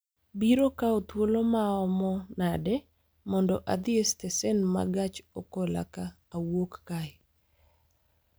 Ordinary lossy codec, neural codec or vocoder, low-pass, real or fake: none; none; none; real